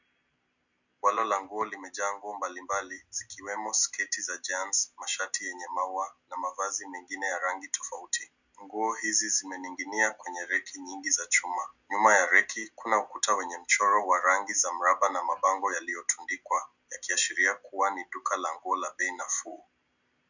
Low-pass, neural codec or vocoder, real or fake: 7.2 kHz; none; real